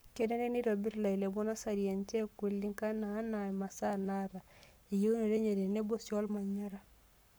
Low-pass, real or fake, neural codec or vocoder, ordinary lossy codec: none; fake; codec, 44.1 kHz, 7.8 kbps, Pupu-Codec; none